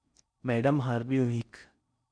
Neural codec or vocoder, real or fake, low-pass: codec, 16 kHz in and 24 kHz out, 0.6 kbps, FocalCodec, streaming, 4096 codes; fake; 9.9 kHz